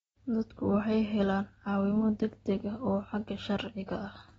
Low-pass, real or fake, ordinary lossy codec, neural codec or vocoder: 9.9 kHz; real; AAC, 24 kbps; none